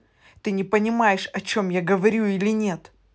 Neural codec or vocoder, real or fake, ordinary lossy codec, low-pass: none; real; none; none